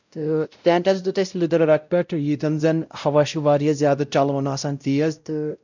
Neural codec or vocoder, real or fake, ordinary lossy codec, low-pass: codec, 16 kHz, 0.5 kbps, X-Codec, WavLM features, trained on Multilingual LibriSpeech; fake; none; 7.2 kHz